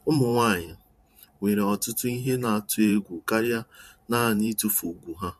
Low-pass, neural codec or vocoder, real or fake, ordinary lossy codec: 14.4 kHz; vocoder, 44.1 kHz, 128 mel bands every 512 samples, BigVGAN v2; fake; MP3, 64 kbps